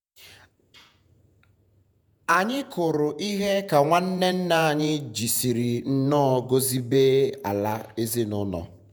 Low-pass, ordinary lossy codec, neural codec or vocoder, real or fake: none; none; vocoder, 48 kHz, 128 mel bands, Vocos; fake